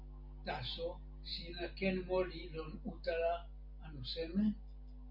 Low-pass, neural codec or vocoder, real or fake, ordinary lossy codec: 5.4 kHz; none; real; MP3, 48 kbps